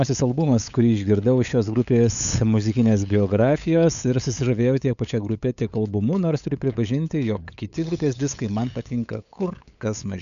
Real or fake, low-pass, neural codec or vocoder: fake; 7.2 kHz; codec, 16 kHz, 8 kbps, FunCodec, trained on LibriTTS, 25 frames a second